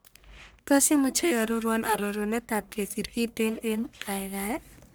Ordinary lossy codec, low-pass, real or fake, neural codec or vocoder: none; none; fake; codec, 44.1 kHz, 1.7 kbps, Pupu-Codec